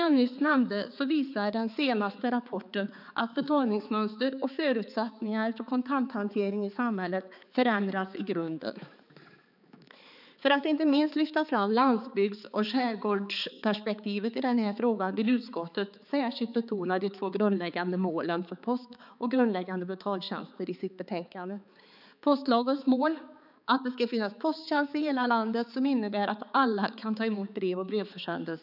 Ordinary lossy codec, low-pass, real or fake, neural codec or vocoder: none; 5.4 kHz; fake; codec, 16 kHz, 4 kbps, X-Codec, HuBERT features, trained on balanced general audio